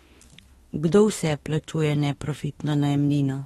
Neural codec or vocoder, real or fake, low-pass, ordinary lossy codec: autoencoder, 48 kHz, 32 numbers a frame, DAC-VAE, trained on Japanese speech; fake; 19.8 kHz; AAC, 32 kbps